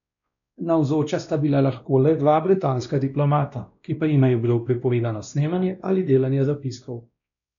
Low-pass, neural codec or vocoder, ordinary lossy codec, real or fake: 7.2 kHz; codec, 16 kHz, 1 kbps, X-Codec, WavLM features, trained on Multilingual LibriSpeech; none; fake